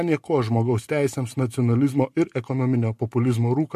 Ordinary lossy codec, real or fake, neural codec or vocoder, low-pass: MP3, 64 kbps; real; none; 14.4 kHz